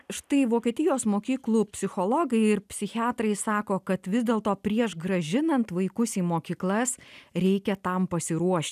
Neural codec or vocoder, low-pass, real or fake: none; 14.4 kHz; real